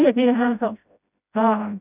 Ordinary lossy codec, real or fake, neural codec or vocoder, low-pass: none; fake; codec, 16 kHz, 0.5 kbps, FreqCodec, smaller model; 3.6 kHz